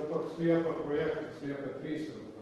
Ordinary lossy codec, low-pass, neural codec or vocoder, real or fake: Opus, 16 kbps; 19.8 kHz; vocoder, 44.1 kHz, 128 mel bands every 512 samples, BigVGAN v2; fake